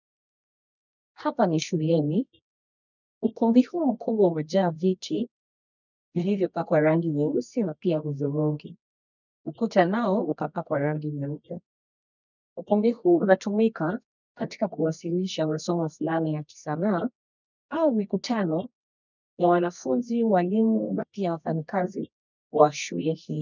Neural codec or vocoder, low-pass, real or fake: codec, 24 kHz, 0.9 kbps, WavTokenizer, medium music audio release; 7.2 kHz; fake